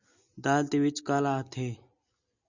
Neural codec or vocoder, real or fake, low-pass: none; real; 7.2 kHz